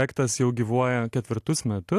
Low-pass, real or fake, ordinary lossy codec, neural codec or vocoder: 14.4 kHz; real; AAC, 64 kbps; none